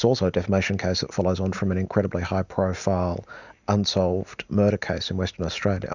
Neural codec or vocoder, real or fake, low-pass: none; real; 7.2 kHz